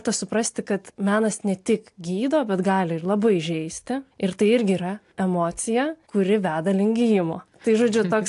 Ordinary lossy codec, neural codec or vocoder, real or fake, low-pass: AAC, 64 kbps; none; real; 10.8 kHz